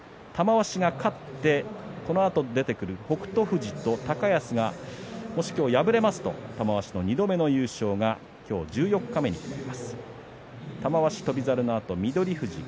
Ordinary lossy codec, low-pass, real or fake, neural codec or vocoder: none; none; real; none